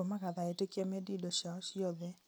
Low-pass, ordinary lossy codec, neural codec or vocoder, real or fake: none; none; none; real